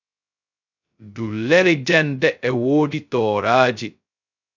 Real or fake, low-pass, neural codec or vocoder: fake; 7.2 kHz; codec, 16 kHz, 0.2 kbps, FocalCodec